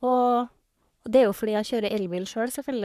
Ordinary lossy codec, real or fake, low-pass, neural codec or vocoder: none; fake; 14.4 kHz; codec, 44.1 kHz, 3.4 kbps, Pupu-Codec